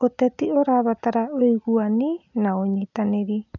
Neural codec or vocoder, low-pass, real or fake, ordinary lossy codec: none; 7.2 kHz; real; none